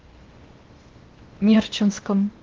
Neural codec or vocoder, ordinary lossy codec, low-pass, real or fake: codec, 16 kHz in and 24 kHz out, 0.8 kbps, FocalCodec, streaming, 65536 codes; Opus, 16 kbps; 7.2 kHz; fake